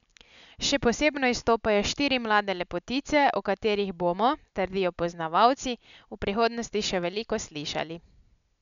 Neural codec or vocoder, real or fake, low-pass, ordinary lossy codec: none; real; 7.2 kHz; none